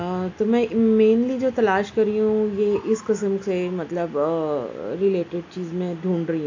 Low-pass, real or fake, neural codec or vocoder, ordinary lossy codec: 7.2 kHz; real; none; AAC, 48 kbps